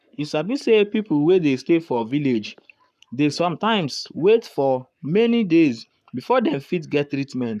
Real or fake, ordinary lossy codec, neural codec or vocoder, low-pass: fake; none; codec, 44.1 kHz, 7.8 kbps, Pupu-Codec; 14.4 kHz